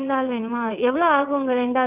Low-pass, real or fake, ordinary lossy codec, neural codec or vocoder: 3.6 kHz; fake; none; vocoder, 22.05 kHz, 80 mel bands, WaveNeXt